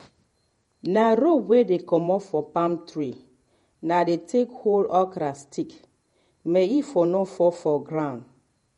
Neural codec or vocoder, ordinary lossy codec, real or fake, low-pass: vocoder, 48 kHz, 128 mel bands, Vocos; MP3, 48 kbps; fake; 19.8 kHz